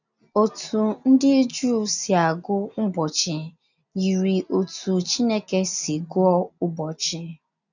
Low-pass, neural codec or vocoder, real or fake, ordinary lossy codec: 7.2 kHz; none; real; none